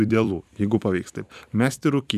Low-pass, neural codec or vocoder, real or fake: 14.4 kHz; vocoder, 44.1 kHz, 128 mel bands every 256 samples, BigVGAN v2; fake